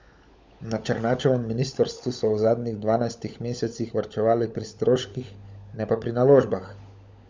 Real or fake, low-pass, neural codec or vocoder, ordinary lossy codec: fake; none; codec, 16 kHz, 16 kbps, FunCodec, trained on LibriTTS, 50 frames a second; none